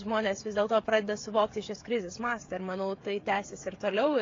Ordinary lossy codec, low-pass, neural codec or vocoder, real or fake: AAC, 32 kbps; 7.2 kHz; codec, 16 kHz, 16 kbps, FreqCodec, smaller model; fake